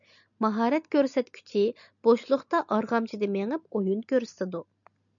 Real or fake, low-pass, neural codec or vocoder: real; 7.2 kHz; none